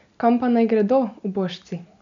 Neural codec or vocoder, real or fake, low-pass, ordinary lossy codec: none; real; 7.2 kHz; MP3, 64 kbps